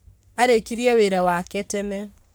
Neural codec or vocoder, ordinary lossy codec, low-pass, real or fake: codec, 44.1 kHz, 3.4 kbps, Pupu-Codec; none; none; fake